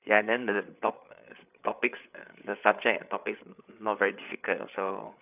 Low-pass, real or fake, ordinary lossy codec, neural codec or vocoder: 3.6 kHz; fake; none; codec, 16 kHz, 8 kbps, FreqCodec, larger model